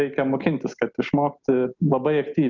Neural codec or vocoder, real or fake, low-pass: none; real; 7.2 kHz